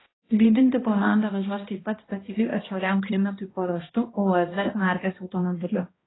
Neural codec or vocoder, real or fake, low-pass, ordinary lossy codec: codec, 16 kHz, 1 kbps, X-Codec, HuBERT features, trained on balanced general audio; fake; 7.2 kHz; AAC, 16 kbps